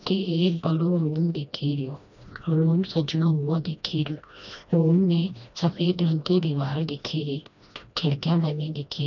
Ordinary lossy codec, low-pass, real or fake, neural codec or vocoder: none; 7.2 kHz; fake; codec, 16 kHz, 1 kbps, FreqCodec, smaller model